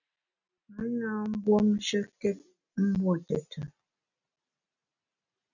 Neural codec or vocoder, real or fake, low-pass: none; real; 7.2 kHz